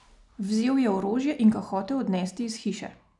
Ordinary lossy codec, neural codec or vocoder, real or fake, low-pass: none; none; real; 10.8 kHz